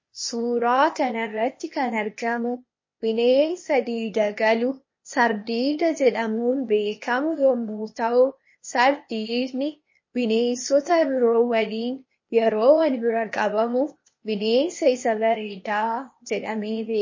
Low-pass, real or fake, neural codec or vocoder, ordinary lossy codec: 7.2 kHz; fake; codec, 16 kHz, 0.8 kbps, ZipCodec; MP3, 32 kbps